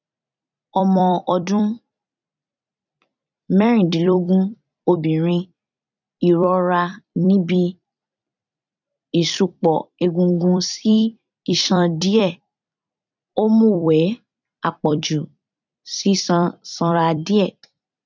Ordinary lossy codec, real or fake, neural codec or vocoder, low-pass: none; fake; vocoder, 44.1 kHz, 128 mel bands every 256 samples, BigVGAN v2; 7.2 kHz